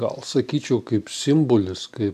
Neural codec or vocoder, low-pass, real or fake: none; 14.4 kHz; real